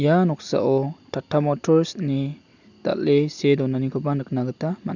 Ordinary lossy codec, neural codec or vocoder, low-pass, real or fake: none; none; 7.2 kHz; real